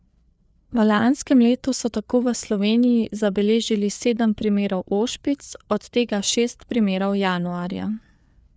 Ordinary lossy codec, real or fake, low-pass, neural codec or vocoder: none; fake; none; codec, 16 kHz, 4 kbps, FreqCodec, larger model